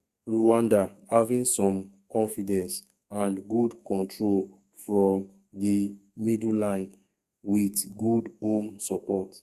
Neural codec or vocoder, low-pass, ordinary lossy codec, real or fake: codec, 32 kHz, 1.9 kbps, SNAC; 14.4 kHz; Opus, 64 kbps; fake